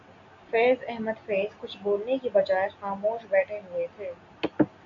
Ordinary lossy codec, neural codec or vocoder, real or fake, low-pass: AAC, 64 kbps; none; real; 7.2 kHz